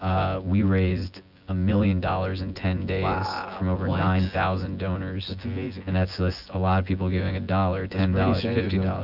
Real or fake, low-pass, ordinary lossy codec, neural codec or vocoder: fake; 5.4 kHz; AAC, 48 kbps; vocoder, 24 kHz, 100 mel bands, Vocos